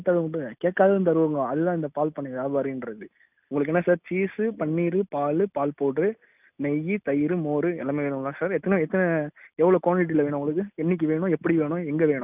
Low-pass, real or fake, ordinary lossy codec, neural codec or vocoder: 3.6 kHz; real; none; none